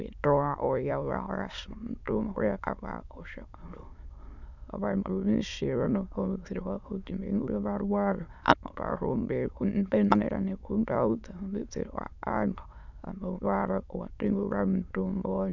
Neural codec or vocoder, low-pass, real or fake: autoencoder, 22.05 kHz, a latent of 192 numbers a frame, VITS, trained on many speakers; 7.2 kHz; fake